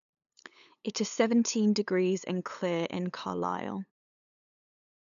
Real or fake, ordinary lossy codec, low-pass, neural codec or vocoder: fake; none; 7.2 kHz; codec, 16 kHz, 8 kbps, FunCodec, trained on LibriTTS, 25 frames a second